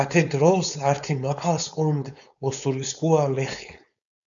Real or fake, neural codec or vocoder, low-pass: fake; codec, 16 kHz, 4.8 kbps, FACodec; 7.2 kHz